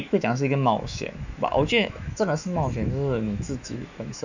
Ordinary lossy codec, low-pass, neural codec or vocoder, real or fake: none; 7.2 kHz; autoencoder, 48 kHz, 128 numbers a frame, DAC-VAE, trained on Japanese speech; fake